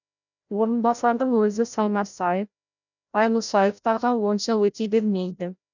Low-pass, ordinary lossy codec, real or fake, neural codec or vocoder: 7.2 kHz; none; fake; codec, 16 kHz, 0.5 kbps, FreqCodec, larger model